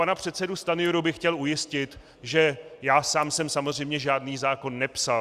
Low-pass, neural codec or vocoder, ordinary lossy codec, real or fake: 14.4 kHz; none; Opus, 64 kbps; real